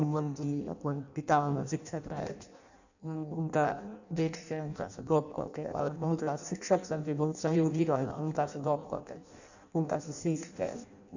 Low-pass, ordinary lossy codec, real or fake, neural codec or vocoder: 7.2 kHz; none; fake; codec, 16 kHz in and 24 kHz out, 0.6 kbps, FireRedTTS-2 codec